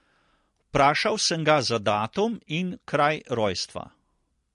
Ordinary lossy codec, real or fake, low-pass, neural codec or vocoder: MP3, 48 kbps; real; 10.8 kHz; none